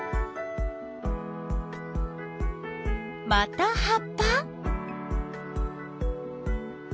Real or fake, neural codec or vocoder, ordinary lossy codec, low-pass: real; none; none; none